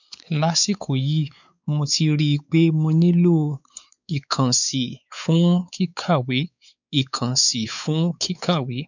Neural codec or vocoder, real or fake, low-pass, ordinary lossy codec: codec, 16 kHz, 4 kbps, X-Codec, WavLM features, trained on Multilingual LibriSpeech; fake; 7.2 kHz; none